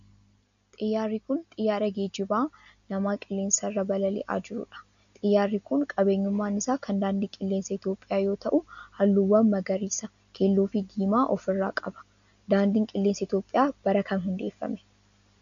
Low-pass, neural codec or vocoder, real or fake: 7.2 kHz; none; real